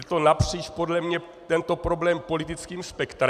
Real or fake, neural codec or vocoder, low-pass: real; none; 14.4 kHz